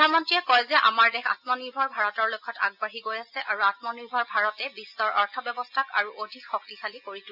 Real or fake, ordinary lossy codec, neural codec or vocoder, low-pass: real; AAC, 48 kbps; none; 5.4 kHz